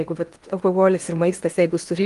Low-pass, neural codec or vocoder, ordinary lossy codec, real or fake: 10.8 kHz; codec, 16 kHz in and 24 kHz out, 0.8 kbps, FocalCodec, streaming, 65536 codes; Opus, 32 kbps; fake